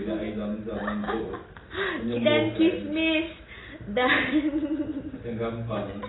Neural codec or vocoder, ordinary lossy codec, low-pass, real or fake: none; AAC, 16 kbps; 7.2 kHz; real